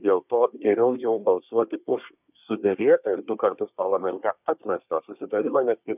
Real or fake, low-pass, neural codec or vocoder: fake; 3.6 kHz; codec, 24 kHz, 1 kbps, SNAC